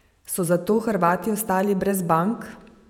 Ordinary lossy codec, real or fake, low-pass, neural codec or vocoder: none; fake; 19.8 kHz; vocoder, 48 kHz, 128 mel bands, Vocos